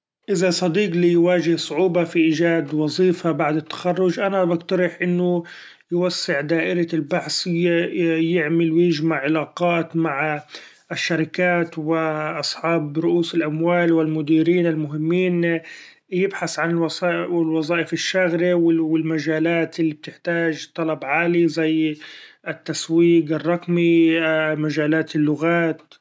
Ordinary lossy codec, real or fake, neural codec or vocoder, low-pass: none; real; none; none